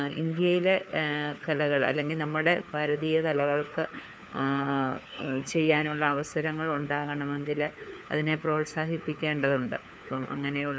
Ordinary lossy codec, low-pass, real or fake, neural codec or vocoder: none; none; fake; codec, 16 kHz, 4 kbps, FunCodec, trained on LibriTTS, 50 frames a second